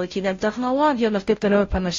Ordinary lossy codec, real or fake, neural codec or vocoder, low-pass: AAC, 32 kbps; fake; codec, 16 kHz, 0.5 kbps, FunCodec, trained on Chinese and English, 25 frames a second; 7.2 kHz